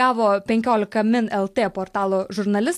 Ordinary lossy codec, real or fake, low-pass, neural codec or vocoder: AAC, 64 kbps; real; 14.4 kHz; none